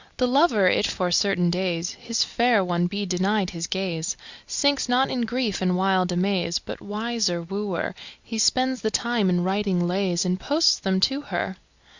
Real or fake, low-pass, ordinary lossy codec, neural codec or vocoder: real; 7.2 kHz; Opus, 64 kbps; none